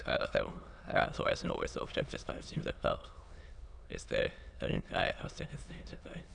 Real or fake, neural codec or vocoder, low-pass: fake; autoencoder, 22.05 kHz, a latent of 192 numbers a frame, VITS, trained on many speakers; 9.9 kHz